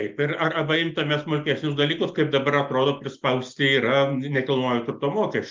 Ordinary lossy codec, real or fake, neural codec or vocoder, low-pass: Opus, 32 kbps; real; none; 7.2 kHz